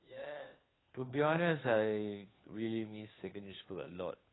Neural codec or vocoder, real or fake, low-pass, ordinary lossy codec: codec, 16 kHz, 2 kbps, FunCodec, trained on Chinese and English, 25 frames a second; fake; 7.2 kHz; AAC, 16 kbps